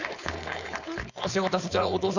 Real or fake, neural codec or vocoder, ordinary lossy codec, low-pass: fake; codec, 16 kHz, 4.8 kbps, FACodec; none; 7.2 kHz